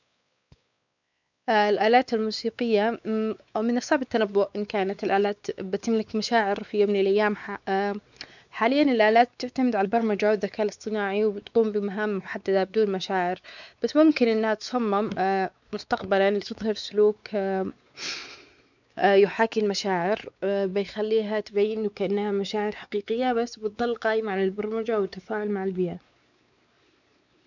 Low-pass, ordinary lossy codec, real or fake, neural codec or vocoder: 7.2 kHz; none; fake; codec, 16 kHz, 4 kbps, X-Codec, WavLM features, trained on Multilingual LibriSpeech